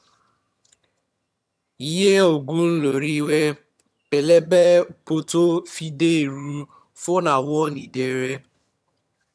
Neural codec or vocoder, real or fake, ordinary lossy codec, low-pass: vocoder, 22.05 kHz, 80 mel bands, HiFi-GAN; fake; none; none